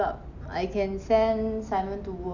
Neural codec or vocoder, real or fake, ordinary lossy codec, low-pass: none; real; none; 7.2 kHz